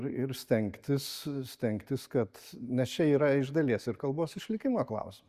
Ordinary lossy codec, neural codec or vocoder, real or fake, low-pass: Opus, 64 kbps; none; real; 14.4 kHz